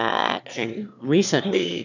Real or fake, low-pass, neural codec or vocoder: fake; 7.2 kHz; autoencoder, 22.05 kHz, a latent of 192 numbers a frame, VITS, trained on one speaker